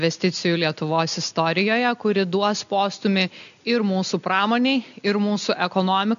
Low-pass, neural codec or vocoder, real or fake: 7.2 kHz; none; real